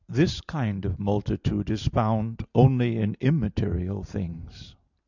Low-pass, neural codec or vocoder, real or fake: 7.2 kHz; none; real